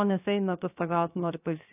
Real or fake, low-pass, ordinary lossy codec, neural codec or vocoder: fake; 3.6 kHz; AAC, 24 kbps; codec, 16 kHz, 0.7 kbps, FocalCodec